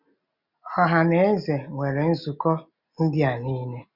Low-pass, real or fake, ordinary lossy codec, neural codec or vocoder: 5.4 kHz; real; none; none